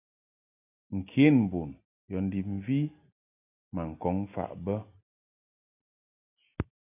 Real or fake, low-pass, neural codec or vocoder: real; 3.6 kHz; none